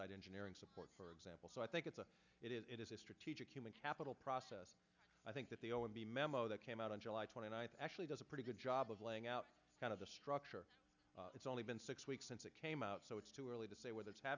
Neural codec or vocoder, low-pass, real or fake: none; 7.2 kHz; real